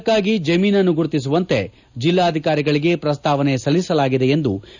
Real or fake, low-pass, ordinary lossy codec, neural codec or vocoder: real; 7.2 kHz; none; none